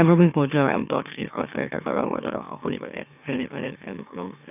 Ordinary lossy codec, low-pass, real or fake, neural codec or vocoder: none; 3.6 kHz; fake; autoencoder, 44.1 kHz, a latent of 192 numbers a frame, MeloTTS